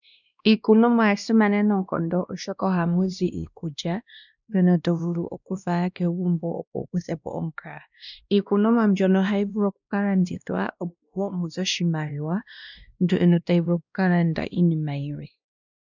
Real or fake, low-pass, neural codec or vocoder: fake; 7.2 kHz; codec, 16 kHz, 1 kbps, X-Codec, WavLM features, trained on Multilingual LibriSpeech